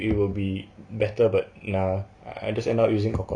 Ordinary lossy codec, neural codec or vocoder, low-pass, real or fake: none; none; 9.9 kHz; real